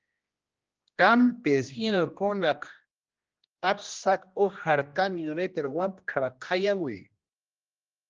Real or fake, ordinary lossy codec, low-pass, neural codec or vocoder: fake; Opus, 24 kbps; 7.2 kHz; codec, 16 kHz, 1 kbps, X-Codec, HuBERT features, trained on general audio